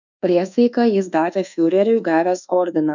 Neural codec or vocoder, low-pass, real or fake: codec, 24 kHz, 1.2 kbps, DualCodec; 7.2 kHz; fake